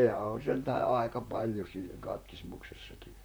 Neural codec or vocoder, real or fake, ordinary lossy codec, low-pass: vocoder, 44.1 kHz, 128 mel bands, Pupu-Vocoder; fake; none; none